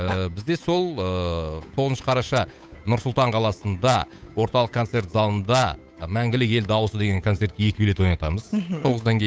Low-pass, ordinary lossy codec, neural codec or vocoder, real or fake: none; none; codec, 16 kHz, 8 kbps, FunCodec, trained on Chinese and English, 25 frames a second; fake